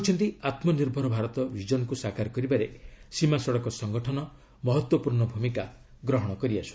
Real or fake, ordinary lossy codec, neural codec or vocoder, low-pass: real; none; none; none